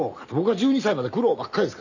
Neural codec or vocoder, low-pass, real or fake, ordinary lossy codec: none; 7.2 kHz; real; none